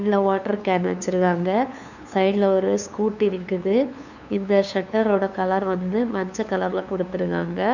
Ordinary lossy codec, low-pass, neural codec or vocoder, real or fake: none; 7.2 kHz; codec, 16 kHz, 2 kbps, FunCodec, trained on LibriTTS, 25 frames a second; fake